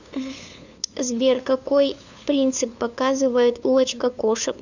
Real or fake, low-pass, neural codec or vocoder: fake; 7.2 kHz; codec, 16 kHz, 2 kbps, FunCodec, trained on LibriTTS, 25 frames a second